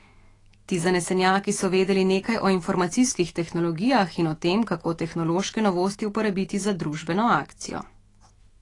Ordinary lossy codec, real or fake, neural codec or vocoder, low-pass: AAC, 32 kbps; fake; autoencoder, 48 kHz, 128 numbers a frame, DAC-VAE, trained on Japanese speech; 10.8 kHz